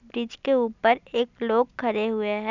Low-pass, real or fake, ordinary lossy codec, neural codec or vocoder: 7.2 kHz; real; none; none